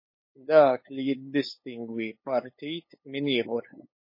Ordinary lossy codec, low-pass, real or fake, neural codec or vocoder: MP3, 24 kbps; 5.4 kHz; fake; codec, 16 kHz, 8 kbps, FunCodec, trained on LibriTTS, 25 frames a second